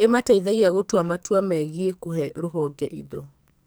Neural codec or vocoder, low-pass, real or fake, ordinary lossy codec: codec, 44.1 kHz, 2.6 kbps, SNAC; none; fake; none